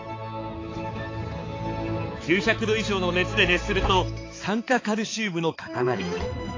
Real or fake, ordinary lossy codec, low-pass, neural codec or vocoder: fake; AAC, 32 kbps; 7.2 kHz; codec, 16 kHz, 4 kbps, X-Codec, HuBERT features, trained on balanced general audio